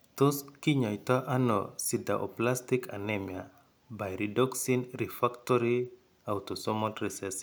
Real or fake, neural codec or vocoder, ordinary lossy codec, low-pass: real; none; none; none